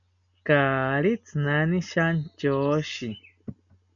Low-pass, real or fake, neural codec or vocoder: 7.2 kHz; real; none